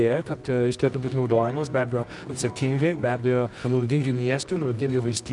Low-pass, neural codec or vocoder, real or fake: 10.8 kHz; codec, 24 kHz, 0.9 kbps, WavTokenizer, medium music audio release; fake